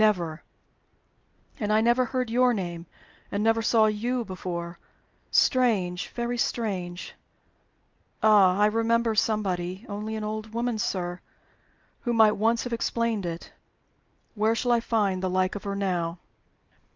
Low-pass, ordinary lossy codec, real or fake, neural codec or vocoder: 7.2 kHz; Opus, 24 kbps; real; none